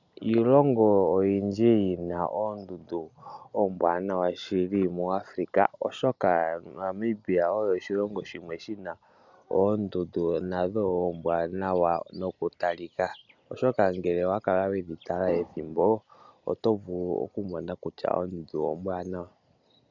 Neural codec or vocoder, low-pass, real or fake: none; 7.2 kHz; real